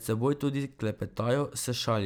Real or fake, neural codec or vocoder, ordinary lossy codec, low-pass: real; none; none; none